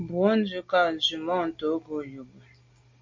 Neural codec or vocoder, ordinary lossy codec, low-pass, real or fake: none; MP3, 48 kbps; 7.2 kHz; real